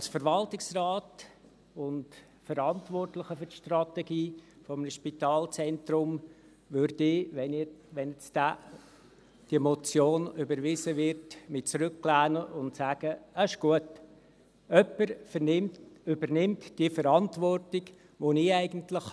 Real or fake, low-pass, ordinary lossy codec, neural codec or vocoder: real; none; none; none